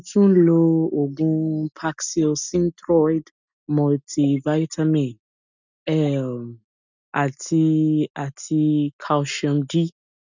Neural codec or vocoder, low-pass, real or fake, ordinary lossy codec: none; 7.2 kHz; real; none